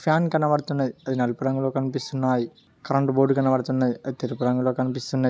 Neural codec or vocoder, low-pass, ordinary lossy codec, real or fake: none; none; none; real